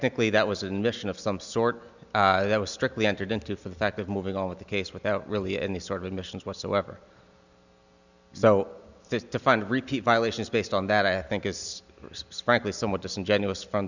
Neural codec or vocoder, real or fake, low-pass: none; real; 7.2 kHz